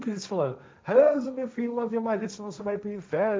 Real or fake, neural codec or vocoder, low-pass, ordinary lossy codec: fake; codec, 16 kHz, 1.1 kbps, Voila-Tokenizer; none; none